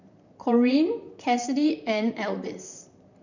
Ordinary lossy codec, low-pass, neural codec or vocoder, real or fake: none; 7.2 kHz; vocoder, 44.1 kHz, 128 mel bands, Pupu-Vocoder; fake